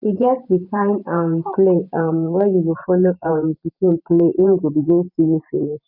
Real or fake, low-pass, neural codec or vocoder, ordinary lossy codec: fake; 5.4 kHz; vocoder, 44.1 kHz, 128 mel bands every 512 samples, BigVGAN v2; none